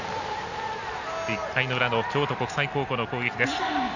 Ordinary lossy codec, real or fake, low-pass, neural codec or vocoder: none; real; 7.2 kHz; none